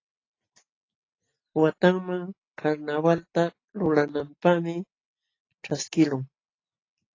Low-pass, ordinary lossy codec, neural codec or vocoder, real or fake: 7.2 kHz; AAC, 32 kbps; none; real